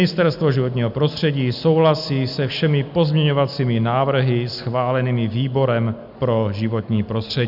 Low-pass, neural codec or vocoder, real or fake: 5.4 kHz; none; real